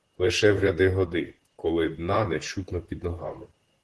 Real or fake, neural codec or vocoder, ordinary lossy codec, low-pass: fake; vocoder, 44.1 kHz, 128 mel bands, Pupu-Vocoder; Opus, 16 kbps; 10.8 kHz